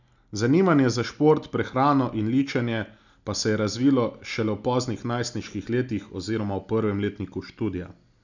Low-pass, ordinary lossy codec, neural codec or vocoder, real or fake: 7.2 kHz; none; none; real